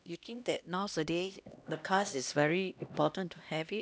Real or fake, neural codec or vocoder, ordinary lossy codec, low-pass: fake; codec, 16 kHz, 1 kbps, X-Codec, HuBERT features, trained on LibriSpeech; none; none